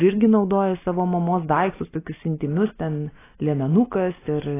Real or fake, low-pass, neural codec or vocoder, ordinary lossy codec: real; 3.6 kHz; none; AAC, 16 kbps